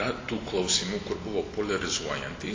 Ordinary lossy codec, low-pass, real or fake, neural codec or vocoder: AAC, 32 kbps; 7.2 kHz; real; none